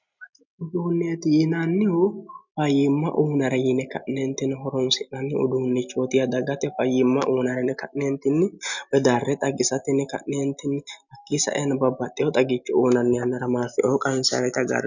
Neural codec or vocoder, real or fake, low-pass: none; real; 7.2 kHz